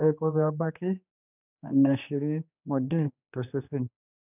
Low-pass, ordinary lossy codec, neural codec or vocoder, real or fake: 3.6 kHz; none; codec, 16 kHz, 2 kbps, X-Codec, HuBERT features, trained on general audio; fake